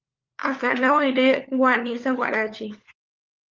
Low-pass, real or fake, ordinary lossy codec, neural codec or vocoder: 7.2 kHz; fake; Opus, 32 kbps; codec, 16 kHz, 4 kbps, FunCodec, trained on LibriTTS, 50 frames a second